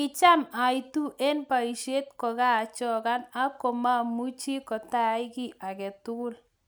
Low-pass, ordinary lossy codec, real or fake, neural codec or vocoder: none; none; real; none